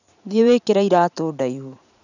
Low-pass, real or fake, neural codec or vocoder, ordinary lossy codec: 7.2 kHz; fake; vocoder, 44.1 kHz, 80 mel bands, Vocos; none